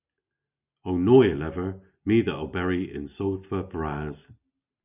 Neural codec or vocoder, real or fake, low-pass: none; real; 3.6 kHz